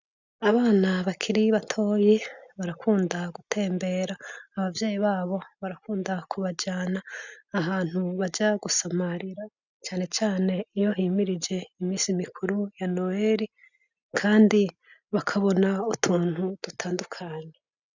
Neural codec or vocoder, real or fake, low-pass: none; real; 7.2 kHz